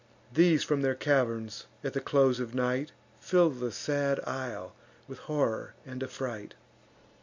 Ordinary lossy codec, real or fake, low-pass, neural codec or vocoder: MP3, 64 kbps; real; 7.2 kHz; none